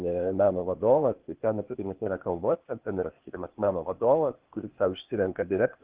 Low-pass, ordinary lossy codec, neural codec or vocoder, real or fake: 3.6 kHz; Opus, 16 kbps; codec, 16 kHz, 0.8 kbps, ZipCodec; fake